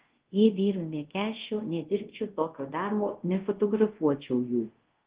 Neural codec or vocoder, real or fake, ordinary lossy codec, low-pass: codec, 24 kHz, 0.5 kbps, DualCodec; fake; Opus, 16 kbps; 3.6 kHz